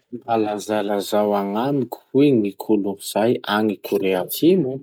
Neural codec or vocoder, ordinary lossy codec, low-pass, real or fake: none; none; 19.8 kHz; real